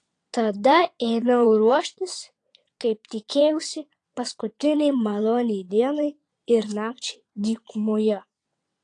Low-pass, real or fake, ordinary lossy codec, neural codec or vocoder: 9.9 kHz; fake; AAC, 48 kbps; vocoder, 22.05 kHz, 80 mel bands, WaveNeXt